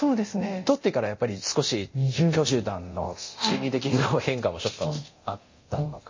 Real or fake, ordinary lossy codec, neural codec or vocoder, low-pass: fake; MP3, 32 kbps; codec, 24 kHz, 0.9 kbps, DualCodec; 7.2 kHz